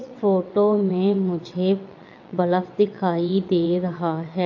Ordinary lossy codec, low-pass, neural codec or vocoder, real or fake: AAC, 48 kbps; 7.2 kHz; vocoder, 22.05 kHz, 80 mel bands, WaveNeXt; fake